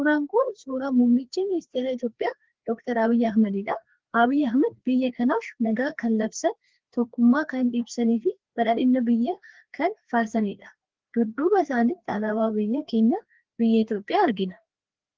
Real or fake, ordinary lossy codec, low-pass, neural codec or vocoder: fake; Opus, 16 kbps; 7.2 kHz; codec, 16 kHz, 2 kbps, FreqCodec, larger model